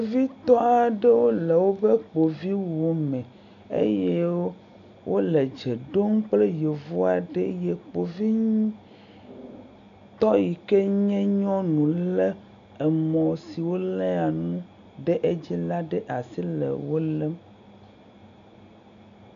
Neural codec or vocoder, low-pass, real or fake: none; 7.2 kHz; real